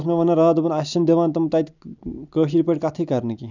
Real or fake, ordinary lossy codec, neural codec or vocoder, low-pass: real; none; none; 7.2 kHz